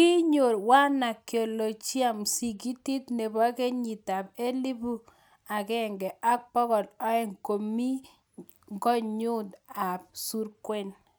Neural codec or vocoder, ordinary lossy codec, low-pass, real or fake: none; none; none; real